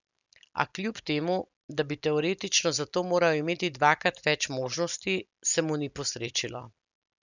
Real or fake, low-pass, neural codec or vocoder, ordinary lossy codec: real; 7.2 kHz; none; none